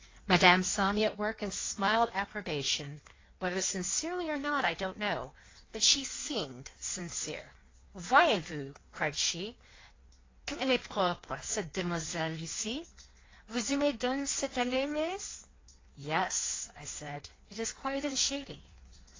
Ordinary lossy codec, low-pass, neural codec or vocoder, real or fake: AAC, 32 kbps; 7.2 kHz; codec, 16 kHz in and 24 kHz out, 1.1 kbps, FireRedTTS-2 codec; fake